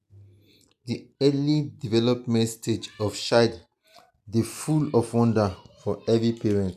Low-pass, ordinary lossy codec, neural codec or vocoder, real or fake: 14.4 kHz; none; none; real